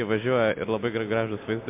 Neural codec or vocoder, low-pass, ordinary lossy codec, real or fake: none; 3.6 kHz; MP3, 32 kbps; real